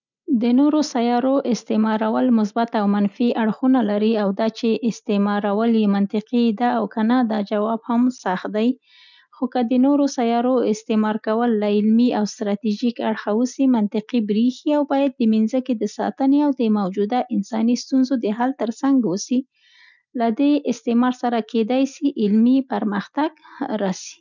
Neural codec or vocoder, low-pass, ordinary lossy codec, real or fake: none; 7.2 kHz; none; real